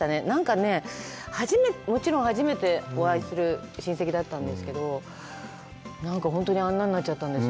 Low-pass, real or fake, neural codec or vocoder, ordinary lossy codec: none; real; none; none